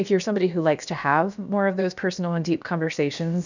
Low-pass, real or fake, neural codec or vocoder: 7.2 kHz; fake; codec, 16 kHz, about 1 kbps, DyCAST, with the encoder's durations